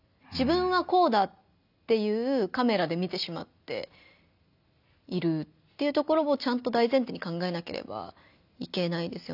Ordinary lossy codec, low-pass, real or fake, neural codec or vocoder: none; 5.4 kHz; real; none